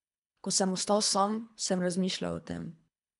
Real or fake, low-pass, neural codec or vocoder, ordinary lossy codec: fake; 10.8 kHz; codec, 24 kHz, 3 kbps, HILCodec; none